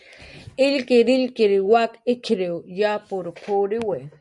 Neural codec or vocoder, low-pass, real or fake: none; 10.8 kHz; real